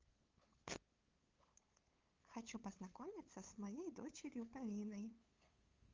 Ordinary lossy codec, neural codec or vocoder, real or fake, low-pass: Opus, 32 kbps; codec, 16 kHz, 8 kbps, FunCodec, trained on LibriTTS, 25 frames a second; fake; 7.2 kHz